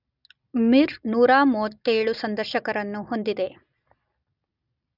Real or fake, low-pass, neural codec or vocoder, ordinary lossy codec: real; 5.4 kHz; none; none